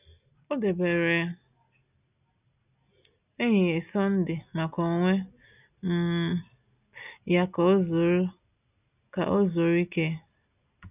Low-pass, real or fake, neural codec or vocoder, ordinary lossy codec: 3.6 kHz; real; none; none